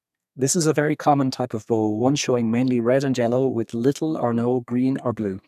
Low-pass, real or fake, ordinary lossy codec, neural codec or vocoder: 14.4 kHz; fake; none; codec, 32 kHz, 1.9 kbps, SNAC